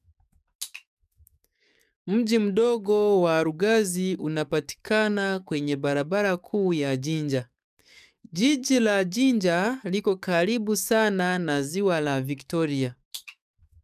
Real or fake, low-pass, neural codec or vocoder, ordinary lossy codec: fake; 14.4 kHz; codec, 44.1 kHz, 7.8 kbps, DAC; none